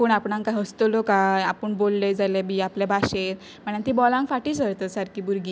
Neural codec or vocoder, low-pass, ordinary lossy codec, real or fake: none; none; none; real